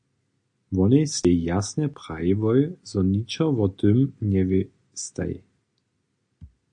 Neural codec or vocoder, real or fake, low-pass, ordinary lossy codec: none; real; 9.9 kHz; AAC, 64 kbps